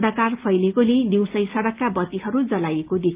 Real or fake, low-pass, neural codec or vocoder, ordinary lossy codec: real; 3.6 kHz; none; Opus, 24 kbps